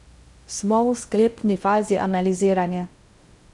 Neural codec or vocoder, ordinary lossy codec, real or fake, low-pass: codec, 16 kHz in and 24 kHz out, 0.6 kbps, FocalCodec, streaming, 2048 codes; Opus, 64 kbps; fake; 10.8 kHz